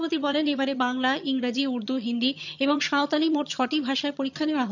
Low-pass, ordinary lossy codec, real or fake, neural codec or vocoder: 7.2 kHz; none; fake; vocoder, 22.05 kHz, 80 mel bands, HiFi-GAN